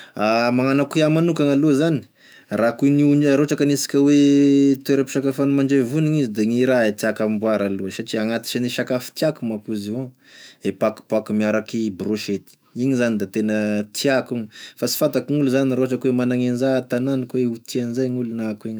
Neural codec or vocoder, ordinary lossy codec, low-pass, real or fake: autoencoder, 48 kHz, 128 numbers a frame, DAC-VAE, trained on Japanese speech; none; none; fake